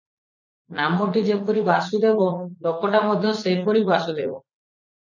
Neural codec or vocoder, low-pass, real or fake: vocoder, 44.1 kHz, 80 mel bands, Vocos; 7.2 kHz; fake